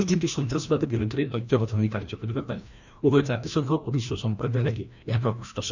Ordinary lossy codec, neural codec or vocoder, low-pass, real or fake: none; codec, 16 kHz, 1 kbps, FreqCodec, larger model; 7.2 kHz; fake